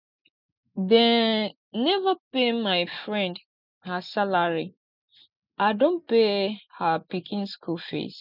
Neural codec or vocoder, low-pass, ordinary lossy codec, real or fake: none; 5.4 kHz; none; real